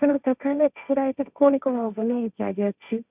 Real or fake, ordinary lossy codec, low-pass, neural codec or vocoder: fake; none; 3.6 kHz; codec, 16 kHz, 1.1 kbps, Voila-Tokenizer